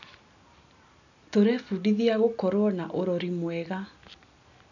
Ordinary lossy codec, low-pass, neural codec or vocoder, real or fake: none; 7.2 kHz; none; real